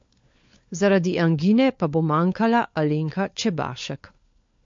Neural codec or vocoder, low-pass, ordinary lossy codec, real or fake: codec, 16 kHz, 4 kbps, FunCodec, trained on LibriTTS, 50 frames a second; 7.2 kHz; MP3, 48 kbps; fake